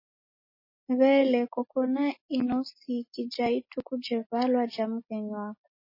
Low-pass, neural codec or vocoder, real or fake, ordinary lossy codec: 5.4 kHz; none; real; MP3, 24 kbps